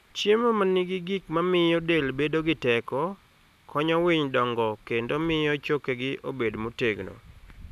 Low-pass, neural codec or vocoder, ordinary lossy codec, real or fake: 14.4 kHz; none; none; real